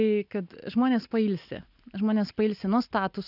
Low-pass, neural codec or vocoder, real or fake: 5.4 kHz; none; real